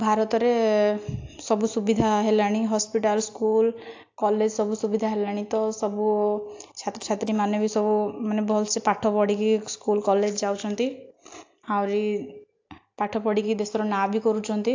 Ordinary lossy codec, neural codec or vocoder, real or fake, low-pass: AAC, 48 kbps; none; real; 7.2 kHz